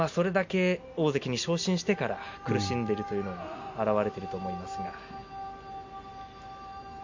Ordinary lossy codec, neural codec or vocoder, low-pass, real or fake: MP3, 64 kbps; none; 7.2 kHz; real